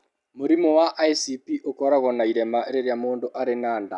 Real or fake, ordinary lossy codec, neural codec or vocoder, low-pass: real; none; none; 10.8 kHz